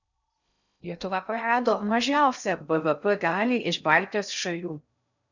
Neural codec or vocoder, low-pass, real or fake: codec, 16 kHz in and 24 kHz out, 0.6 kbps, FocalCodec, streaming, 2048 codes; 7.2 kHz; fake